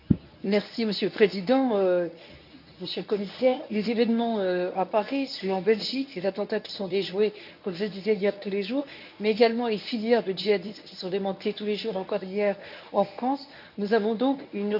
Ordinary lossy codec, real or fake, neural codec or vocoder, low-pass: none; fake; codec, 24 kHz, 0.9 kbps, WavTokenizer, medium speech release version 1; 5.4 kHz